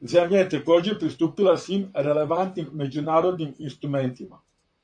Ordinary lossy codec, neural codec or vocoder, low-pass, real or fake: MP3, 64 kbps; vocoder, 44.1 kHz, 128 mel bands, Pupu-Vocoder; 9.9 kHz; fake